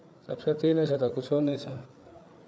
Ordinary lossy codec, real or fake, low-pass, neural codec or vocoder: none; fake; none; codec, 16 kHz, 8 kbps, FreqCodec, larger model